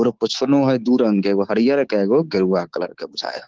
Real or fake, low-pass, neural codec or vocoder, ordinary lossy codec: fake; 7.2 kHz; codec, 24 kHz, 3.1 kbps, DualCodec; Opus, 16 kbps